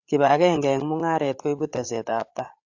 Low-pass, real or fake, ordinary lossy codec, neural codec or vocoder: 7.2 kHz; fake; AAC, 48 kbps; codec, 16 kHz, 8 kbps, FreqCodec, larger model